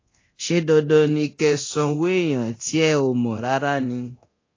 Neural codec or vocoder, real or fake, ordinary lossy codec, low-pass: codec, 24 kHz, 0.9 kbps, DualCodec; fake; AAC, 32 kbps; 7.2 kHz